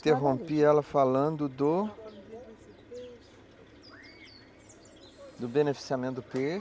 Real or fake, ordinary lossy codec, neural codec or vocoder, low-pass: real; none; none; none